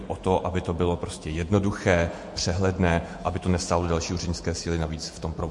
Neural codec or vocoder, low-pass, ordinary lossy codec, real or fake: vocoder, 48 kHz, 128 mel bands, Vocos; 10.8 kHz; MP3, 48 kbps; fake